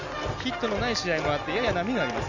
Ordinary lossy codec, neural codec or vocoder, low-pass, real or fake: none; none; 7.2 kHz; real